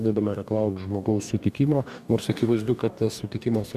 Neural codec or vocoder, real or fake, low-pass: codec, 44.1 kHz, 2.6 kbps, DAC; fake; 14.4 kHz